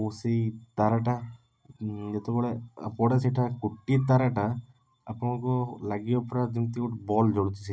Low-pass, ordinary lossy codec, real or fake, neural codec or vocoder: none; none; real; none